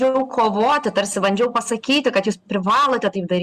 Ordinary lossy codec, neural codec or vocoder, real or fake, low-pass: Opus, 64 kbps; none; real; 14.4 kHz